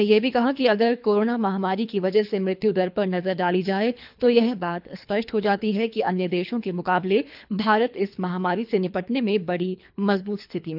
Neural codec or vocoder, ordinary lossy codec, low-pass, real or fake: codec, 24 kHz, 3 kbps, HILCodec; none; 5.4 kHz; fake